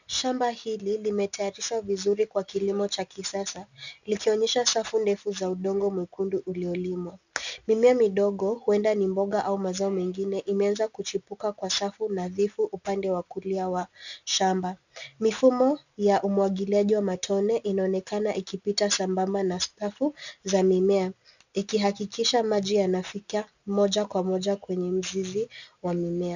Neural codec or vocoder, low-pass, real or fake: none; 7.2 kHz; real